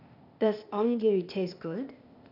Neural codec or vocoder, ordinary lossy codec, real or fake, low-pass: codec, 16 kHz, 0.8 kbps, ZipCodec; none; fake; 5.4 kHz